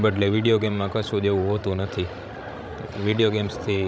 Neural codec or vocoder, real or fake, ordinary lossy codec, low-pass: codec, 16 kHz, 16 kbps, FreqCodec, larger model; fake; none; none